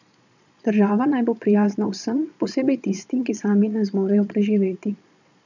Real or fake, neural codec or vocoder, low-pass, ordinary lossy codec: fake; vocoder, 22.05 kHz, 80 mel bands, Vocos; 7.2 kHz; none